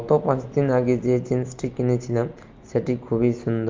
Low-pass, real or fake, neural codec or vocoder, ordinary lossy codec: 7.2 kHz; real; none; Opus, 24 kbps